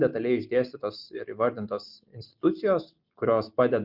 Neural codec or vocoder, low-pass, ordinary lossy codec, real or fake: none; 5.4 kHz; Opus, 64 kbps; real